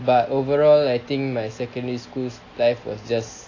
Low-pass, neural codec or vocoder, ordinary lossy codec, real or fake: 7.2 kHz; none; AAC, 32 kbps; real